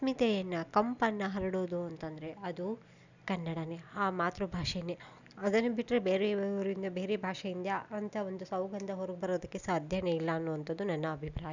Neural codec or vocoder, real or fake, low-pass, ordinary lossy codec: none; real; 7.2 kHz; none